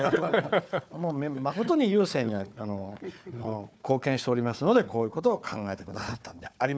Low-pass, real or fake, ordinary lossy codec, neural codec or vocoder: none; fake; none; codec, 16 kHz, 4 kbps, FunCodec, trained on Chinese and English, 50 frames a second